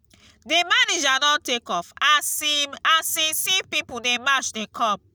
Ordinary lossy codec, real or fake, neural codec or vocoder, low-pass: none; real; none; none